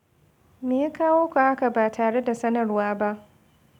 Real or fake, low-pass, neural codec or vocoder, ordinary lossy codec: real; 19.8 kHz; none; none